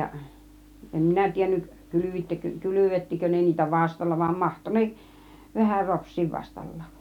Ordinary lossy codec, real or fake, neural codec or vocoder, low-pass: none; real; none; 19.8 kHz